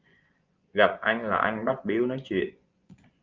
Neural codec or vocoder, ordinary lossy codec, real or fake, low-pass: vocoder, 24 kHz, 100 mel bands, Vocos; Opus, 32 kbps; fake; 7.2 kHz